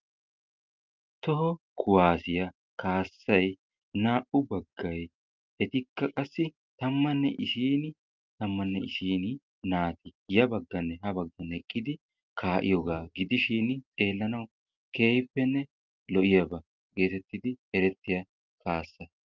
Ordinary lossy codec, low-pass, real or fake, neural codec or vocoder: Opus, 32 kbps; 7.2 kHz; real; none